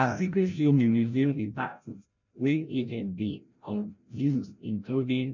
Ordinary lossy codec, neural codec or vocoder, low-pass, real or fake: none; codec, 16 kHz, 0.5 kbps, FreqCodec, larger model; 7.2 kHz; fake